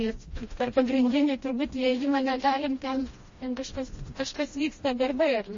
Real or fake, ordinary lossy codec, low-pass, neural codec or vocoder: fake; MP3, 32 kbps; 7.2 kHz; codec, 16 kHz, 1 kbps, FreqCodec, smaller model